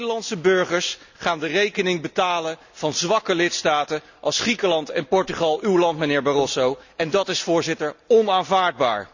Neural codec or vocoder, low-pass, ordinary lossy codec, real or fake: none; 7.2 kHz; none; real